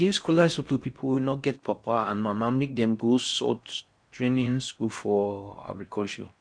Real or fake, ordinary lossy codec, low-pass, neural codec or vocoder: fake; none; 9.9 kHz; codec, 16 kHz in and 24 kHz out, 0.6 kbps, FocalCodec, streaming, 4096 codes